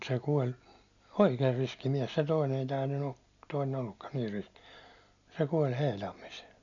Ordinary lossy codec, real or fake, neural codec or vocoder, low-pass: none; real; none; 7.2 kHz